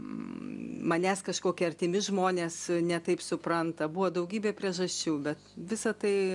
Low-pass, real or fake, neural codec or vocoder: 10.8 kHz; real; none